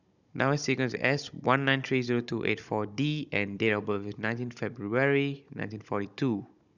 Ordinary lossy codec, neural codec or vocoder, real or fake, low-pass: none; codec, 16 kHz, 16 kbps, FunCodec, trained on Chinese and English, 50 frames a second; fake; 7.2 kHz